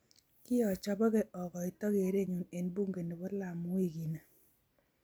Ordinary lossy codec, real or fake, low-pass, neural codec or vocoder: none; real; none; none